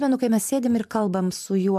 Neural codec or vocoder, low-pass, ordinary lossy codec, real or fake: vocoder, 44.1 kHz, 128 mel bands every 512 samples, BigVGAN v2; 14.4 kHz; AAC, 96 kbps; fake